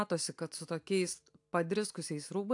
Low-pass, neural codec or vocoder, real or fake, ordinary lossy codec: 10.8 kHz; none; real; AAC, 64 kbps